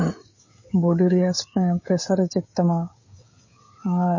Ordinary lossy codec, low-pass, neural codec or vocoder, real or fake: MP3, 32 kbps; 7.2 kHz; codec, 16 kHz, 16 kbps, FreqCodec, smaller model; fake